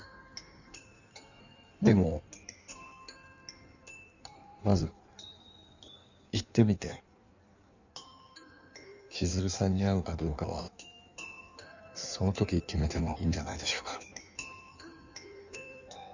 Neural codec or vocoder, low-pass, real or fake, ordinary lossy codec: codec, 16 kHz in and 24 kHz out, 1.1 kbps, FireRedTTS-2 codec; 7.2 kHz; fake; none